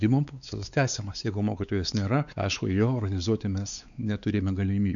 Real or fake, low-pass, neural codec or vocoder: fake; 7.2 kHz; codec, 16 kHz, 4 kbps, X-Codec, WavLM features, trained on Multilingual LibriSpeech